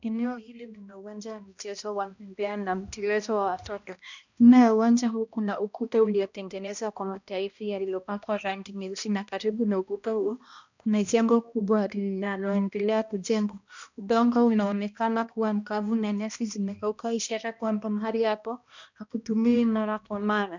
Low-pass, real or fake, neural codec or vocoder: 7.2 kHz; fake; codec, 16 kHz, 1 kbps, X-Codec, HuBERT features, trained on balanced general audio